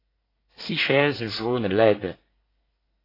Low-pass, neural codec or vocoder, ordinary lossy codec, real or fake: 5.4 kHz; codec, 24 kHz, 1 kbps, SNAC; AAC, 24 kbps; fake